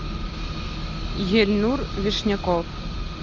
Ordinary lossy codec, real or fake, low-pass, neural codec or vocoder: Opus, 32 kbps; real; 7.2 kHz; none